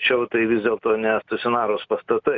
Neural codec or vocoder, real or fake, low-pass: none; real; 7.2 kHz